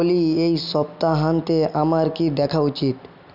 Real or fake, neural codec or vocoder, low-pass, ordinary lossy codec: real; none; 5.4 kHz; none